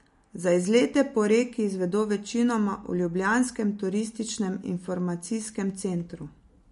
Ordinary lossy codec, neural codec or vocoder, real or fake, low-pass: MP3, 48 kbps; none; real; 10.8 kHz